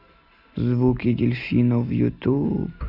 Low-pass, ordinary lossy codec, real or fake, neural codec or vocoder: 5.4 kHz; Opus, 64 kbps; real; none